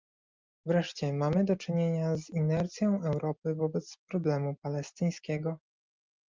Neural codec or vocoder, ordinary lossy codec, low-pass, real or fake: none; Opus, 24 kbps; 7.2 kHz; real